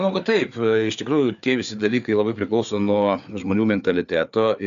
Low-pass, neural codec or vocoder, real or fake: 7.2 kHz; codec, 16 kHz, 4 kbps, FreqCodec, larger model; fake